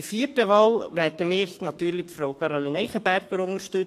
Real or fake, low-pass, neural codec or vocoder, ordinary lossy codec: fake; 14.4 kHz; codec, 32 kHz, 1.9 kbps, SNAC; AAC, 64 kbps